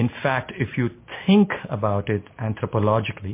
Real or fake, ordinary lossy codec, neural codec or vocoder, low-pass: real; MP3, 24 kbps; none; 3.6 kHz